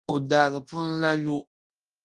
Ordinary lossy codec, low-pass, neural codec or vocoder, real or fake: Opus, 24 kbps; 10.8 kHz; codec, 24 kHz, 0.9 kbps, WavTokenizer, large speech release; fake